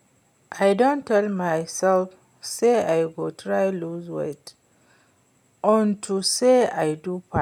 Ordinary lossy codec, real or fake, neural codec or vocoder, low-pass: none; real; none; 19.8 kHz